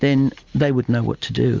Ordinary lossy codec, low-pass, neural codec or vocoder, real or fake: Opus, 32 kbps; 7.2 kHz; none; real